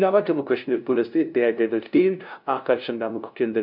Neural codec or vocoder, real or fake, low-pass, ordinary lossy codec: codec, 16 kHz, 0.5 kbps, FunCodec, trained on LibriTTS, 25 frames a second; fake; 5.4 kHz; none